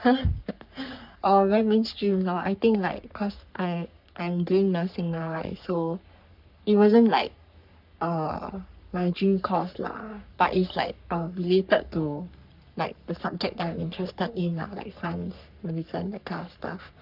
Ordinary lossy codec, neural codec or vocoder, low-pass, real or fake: none; codec, 44.1 kHz, 3.4 kbps, Pupu-Codec; 5.4 kHz; fake